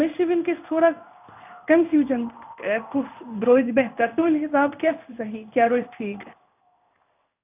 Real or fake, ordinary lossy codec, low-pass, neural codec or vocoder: fake; none; 3.6 kHz; codec, 16 kHz in and 24 kHz out, 1 kbps, XY-Tokenizer